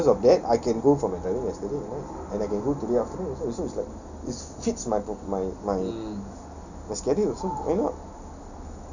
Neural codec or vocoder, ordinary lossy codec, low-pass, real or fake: none; none; 7.2 kHz; real